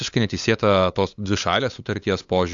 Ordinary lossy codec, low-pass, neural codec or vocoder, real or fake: AAC, 64 kbps; 7.2 kHz; codec, 16 kHz, 8 kbps, FunCodec, trained on LibriTTS, 25 frames a second; fake